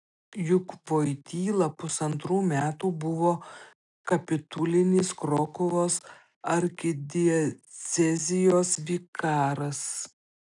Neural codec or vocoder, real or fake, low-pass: none; real; 10.8 kHz